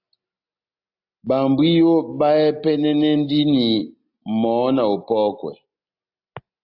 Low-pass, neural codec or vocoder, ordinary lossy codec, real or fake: 5.4 kHz; none; AAC, 48 kbps; real